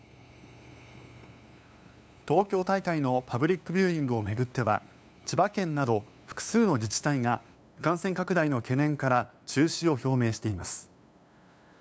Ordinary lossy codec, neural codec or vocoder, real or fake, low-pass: none; codec, 16 kHz, 2 kbps, FunCodec, trained on LibriTTS, 25 frames a second; fake; none